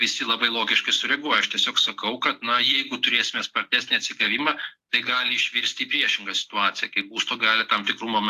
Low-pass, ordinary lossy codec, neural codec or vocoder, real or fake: 14.4 kHz; AAC, 64 kbps; none; real